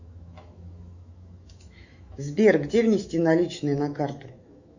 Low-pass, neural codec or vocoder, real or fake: 7.2 kHz; autoencoder, 48 kHz, 128 numbers a frame, DAC-VAE, trained on Japanese speech; fake